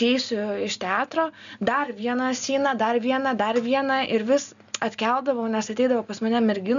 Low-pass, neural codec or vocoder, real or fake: 7.2 kHz; none; real